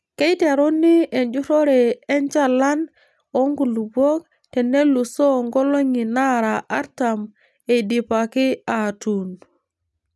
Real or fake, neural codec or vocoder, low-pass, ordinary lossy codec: real; none; none; none